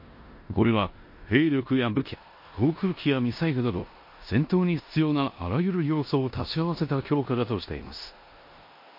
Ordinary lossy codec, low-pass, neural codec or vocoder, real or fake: MP3, 32 kbps; 5.4 kHz; codec, 16 kHz in and 24 kHz out, 0.9 kbps, LongCat-Audio-Codec, four codebook decoder; fake